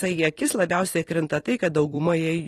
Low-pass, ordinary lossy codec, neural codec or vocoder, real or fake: 19.8 kHz; AAC, 32 kbps; vocoder, 44.1 kHz, 128 mel bands every 256 samples, BigVGAN v2; fake